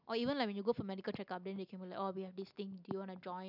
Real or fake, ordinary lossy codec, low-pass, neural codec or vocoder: real; none; 5.4 kHz; none